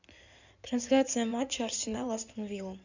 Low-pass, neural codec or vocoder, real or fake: 7.2 kHz; codec, 16 kHz in and 24 kHz out, 2.2 kbps, FireRedTTS-2 codec; fake